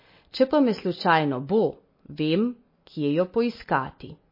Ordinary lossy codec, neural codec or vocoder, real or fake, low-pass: MP3, 24 kbps; none; real; 5.4 kHz